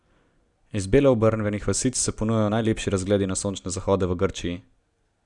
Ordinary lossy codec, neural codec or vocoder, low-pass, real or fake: none; none; 10.8 kHz; real